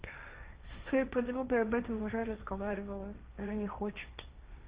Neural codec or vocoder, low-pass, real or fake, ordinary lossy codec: codec, 16 kHz, 1.1 kbps, Voila-Tokenizer; 3.6 kHz; fake; Opus, 24 kbps